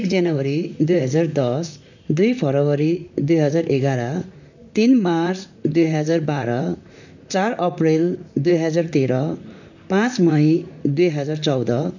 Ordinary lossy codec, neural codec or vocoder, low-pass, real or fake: none; vocoder, 44.1 kHz, 128 mel bands, Pupu-Vocoder; 7.2 kHz; fake